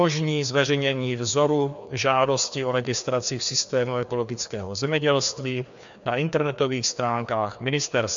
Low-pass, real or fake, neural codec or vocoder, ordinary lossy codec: 7.2 kHz; fake; codec, 16 kHz, 2 kbps, FreqCodec, larger model; MP3, 64 kbps